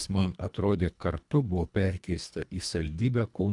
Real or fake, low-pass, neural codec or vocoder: fake; 10.8 kHz; codec, 24 kHz, 1.5 kbps, HILCodec